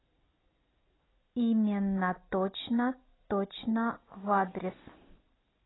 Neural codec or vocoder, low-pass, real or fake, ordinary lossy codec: none; 7.2 kHz; real; AAC, 16 kbps